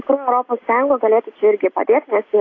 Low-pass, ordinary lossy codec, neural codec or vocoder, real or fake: 7.2 kHz; AAC, 32 kbps; none; real